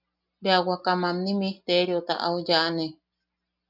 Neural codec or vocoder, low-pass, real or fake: none; 5.4 kHz; real